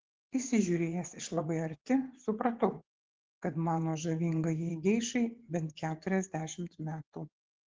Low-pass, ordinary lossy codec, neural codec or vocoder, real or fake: 7.2 kHz; Opus, 16 kbps; vocoder, 24 kHz, 100 mel bands, Vocos; fake